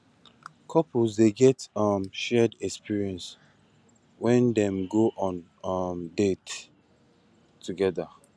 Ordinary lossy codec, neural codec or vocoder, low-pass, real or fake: none; none; none; real